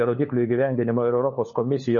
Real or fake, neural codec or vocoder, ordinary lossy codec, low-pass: fake; codec, 16 kHz, 4 kbps, FunCodec, trained on LibriTTS, 50 frames a second; MP3, 32 kbps; 7.2 kHz